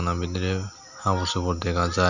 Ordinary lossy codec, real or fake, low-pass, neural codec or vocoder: none; real; 7.2 kHz; none